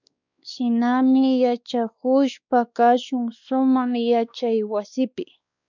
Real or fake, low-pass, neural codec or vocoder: fake; 7.2 kHz; codec, 16 kHz, 2 kbps, X-Codec, WavLM features, trained on Multilingual LibriSpeech